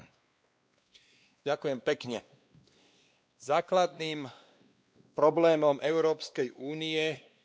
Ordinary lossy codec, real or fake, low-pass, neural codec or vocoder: none; fake; none; codec, 16 kHz, 2 kbps, X-Codec, WavLM features, trained on Multilingual LibriSpeech